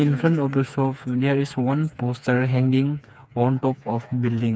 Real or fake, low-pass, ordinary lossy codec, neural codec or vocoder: fake; none; none; codec, 16 kHz, 4 kbps, FreqCodec, smaller model